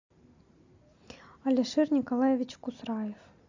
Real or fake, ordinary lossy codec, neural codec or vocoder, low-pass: real; AAC, 48 kbps; none; 7.2 kHz